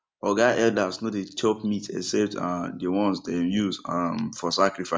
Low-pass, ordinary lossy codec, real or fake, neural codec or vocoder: none; none; real; none